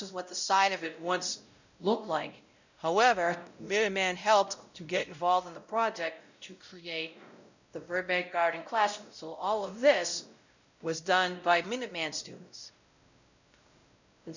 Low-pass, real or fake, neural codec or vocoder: 7.2 kHz; fake; codec, 16 kHz, 0.5 kbps, X-Codec, WavLM features, trained on Multilingual LibriSpeech